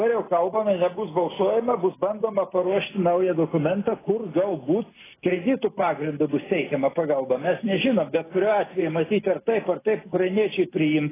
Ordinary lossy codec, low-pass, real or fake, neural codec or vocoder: AAC, 16 kbps; 3.6 kHz; real; none